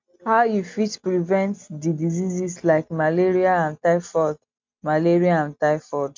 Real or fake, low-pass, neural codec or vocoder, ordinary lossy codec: real; 7.2 kHz; none; MP3, 64 kbps